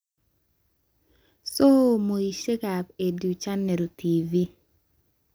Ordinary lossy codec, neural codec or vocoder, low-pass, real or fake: none; none; none; real